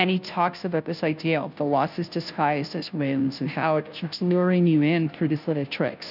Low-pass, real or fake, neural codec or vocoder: 5.4 kHz; fake; codec, 16 kHz, 0.5 kbps, FunCodec, trained on Chinese and English, 25 frames a second